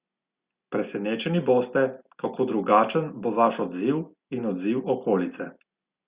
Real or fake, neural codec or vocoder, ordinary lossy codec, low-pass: real; none; Opus, 64 kbps; 3.6 kHz